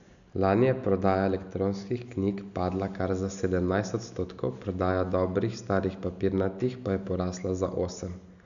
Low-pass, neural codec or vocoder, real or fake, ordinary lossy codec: 7.2 kHz; none; real; none